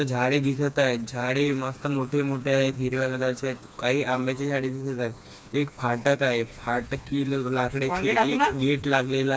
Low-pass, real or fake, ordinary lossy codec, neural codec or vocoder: none; fake; none; codec, 16 kHz, 2 kbps, FreqCodec, smaller model